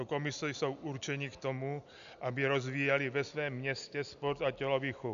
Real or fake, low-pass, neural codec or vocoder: real; 7.2 kHz; none